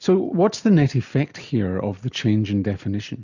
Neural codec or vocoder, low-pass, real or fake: none; 7.2 kHz; real